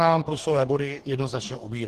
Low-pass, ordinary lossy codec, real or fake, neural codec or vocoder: 14.4 kHz; Opus, 16 kbps; fake; codec, 44.1 kHz, 2.6 kbps, DAC